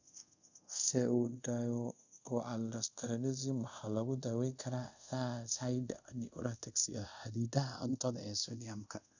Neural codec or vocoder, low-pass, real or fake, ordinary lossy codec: codec, 24 kHz, 0.5 kbps, DualCodec; 7.2 kHz; fake; none